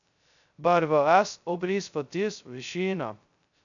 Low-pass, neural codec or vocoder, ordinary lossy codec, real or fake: 7.2 kHz; codec, 16 kHz, 0.2 kbps, FocalCodec; none; fake